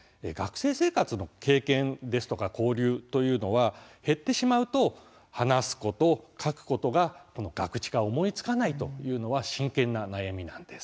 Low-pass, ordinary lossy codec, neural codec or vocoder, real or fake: none; none; none; real